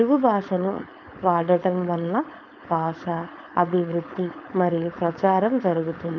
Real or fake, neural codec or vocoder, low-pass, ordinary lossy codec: fake; codec, 16 kHz, 4.8 kbps, FACodec; 7.2 kHz; none